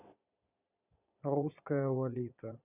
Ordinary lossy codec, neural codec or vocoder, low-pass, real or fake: none; vocoder, 22.05 kHz, 80 mel bands, Vocos; 3.6 kHz; fake